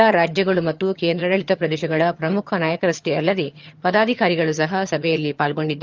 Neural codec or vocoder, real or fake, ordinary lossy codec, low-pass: vocoder, 22.05 kHz, 80 mel bands, HiFi-GAN; fake; Opus, 32 kbps; 7.2 kHz